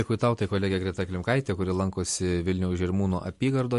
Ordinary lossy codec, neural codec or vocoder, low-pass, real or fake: MP3, 48 kbps; none; 14.4 kHz; real